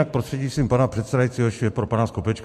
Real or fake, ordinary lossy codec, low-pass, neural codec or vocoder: fake; MP3, 64 kbps; 14.4 kHz; vocoder, 44.1 kHz, 128 mel bands every 512 samples, BigVGAN v2